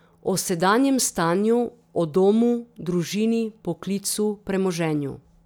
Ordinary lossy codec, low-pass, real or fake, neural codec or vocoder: none; none; real; none